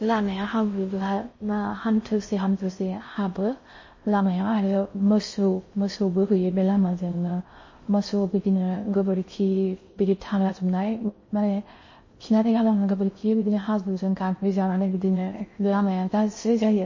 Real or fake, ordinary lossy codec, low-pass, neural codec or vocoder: fake; MP3, 32 kbps; 7.2 kHz; codec, 16 kHz in and 24 kHz out, 0.6 kbps, FocalCodec, streaming, 4096 codes